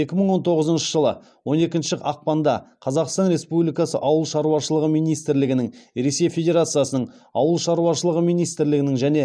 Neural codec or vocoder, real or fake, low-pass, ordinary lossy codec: none; real; none; none